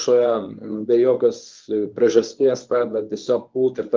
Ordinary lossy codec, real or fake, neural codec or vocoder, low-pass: Opus, 32 kbps; fake; codec, 24 kHz, 0.9 kbps, WavTokenizer, medium speech release version 1; 7.2 kHz